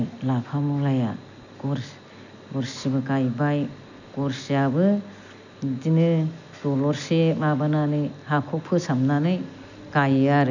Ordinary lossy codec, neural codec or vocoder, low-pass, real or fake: none; none; 7.2 kHz; real